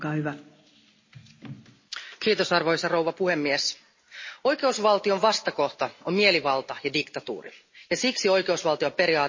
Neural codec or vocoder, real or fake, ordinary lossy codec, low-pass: none; real; MP3, 32 kbps; 7.2 kHz